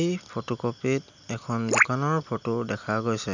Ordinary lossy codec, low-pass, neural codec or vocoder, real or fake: none; 7.2 kHz; none; real